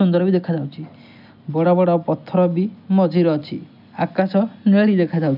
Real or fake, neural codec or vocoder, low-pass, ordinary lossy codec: real; none; 5.4 kHz; none